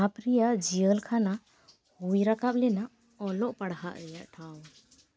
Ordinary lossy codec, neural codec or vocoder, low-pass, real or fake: none; none; none; real